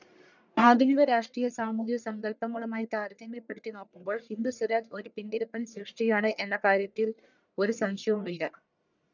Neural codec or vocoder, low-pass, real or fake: codec, 44.1 kHz, 1.7 kbps, Pupu-Codec; 7.2 kHz; fake